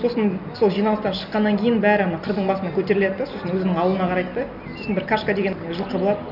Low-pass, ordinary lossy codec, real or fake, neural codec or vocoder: 5.4 kHz; none; real; none